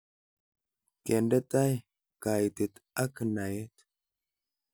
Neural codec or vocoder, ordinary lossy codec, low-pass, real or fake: none; none; none; real